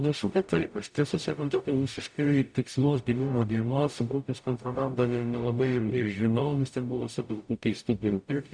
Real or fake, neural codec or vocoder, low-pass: fake; codec, 44.1 kHz, 0.9 kbps, DAC; 9.9 kHz